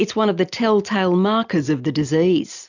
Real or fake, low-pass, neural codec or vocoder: real; 7.2 kHz; none